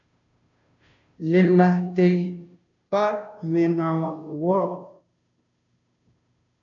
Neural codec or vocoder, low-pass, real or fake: codec, 16 kHz, 0.5 kbps, FunCodec, trained on Chinese and English, 25 frames a second; 7.2 kHz; fake